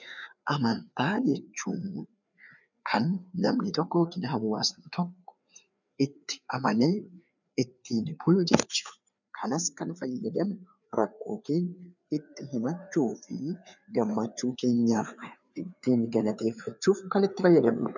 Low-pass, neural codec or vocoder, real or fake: 7.2 kHz; codec, 16 kHz, 4 kbps, FreqCodec, larger model; fake